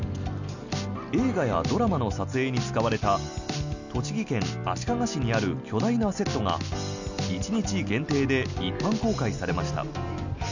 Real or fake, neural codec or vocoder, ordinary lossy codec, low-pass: real; none; none; 7.2 kHz